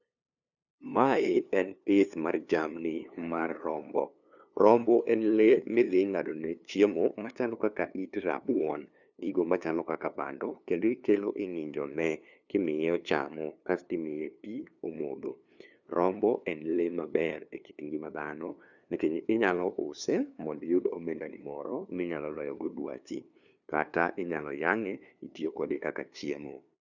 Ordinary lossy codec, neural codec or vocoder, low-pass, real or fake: none; codec, 16 kHz, 2 kbps, FunCodec, trained on LibriTTS, 25 frames a second; 7.2 kHz; fake